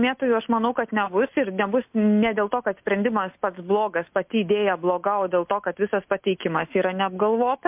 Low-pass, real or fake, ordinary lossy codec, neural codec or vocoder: 3.6 kHz; real; MP3, 32 kbps; none